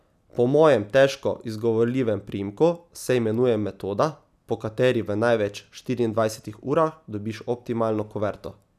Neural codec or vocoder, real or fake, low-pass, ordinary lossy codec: none; real; 14.4 kHz; none